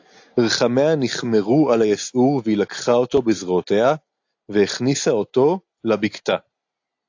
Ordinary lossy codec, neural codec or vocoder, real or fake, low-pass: AAC, 48 kbps; none; real; 7.2 kHz